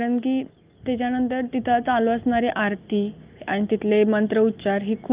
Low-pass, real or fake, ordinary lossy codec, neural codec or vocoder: 3.6 kHz; real; Opus, 32 kbps; none